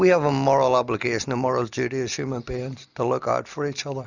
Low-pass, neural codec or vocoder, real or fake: 7.2 kHz; none; real